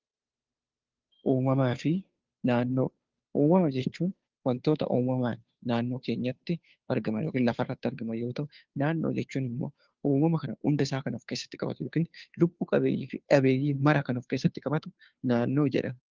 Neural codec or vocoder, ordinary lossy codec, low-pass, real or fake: codec, 16 kHz, 2 kbps, FunCodec, trained on Chinese and English, 25 frames a second; Opus, 24 kbps; 7.2 kHz; fake